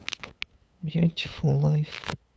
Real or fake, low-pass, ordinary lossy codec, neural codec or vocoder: fake; none; none; codec, 16 kHz, 8 kbps, FunCodec, trained on LibriTTS, 25 frames a second